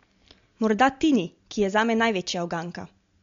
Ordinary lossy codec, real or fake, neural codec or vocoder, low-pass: MP3, 48 kbps; real; none; 7.2 kHz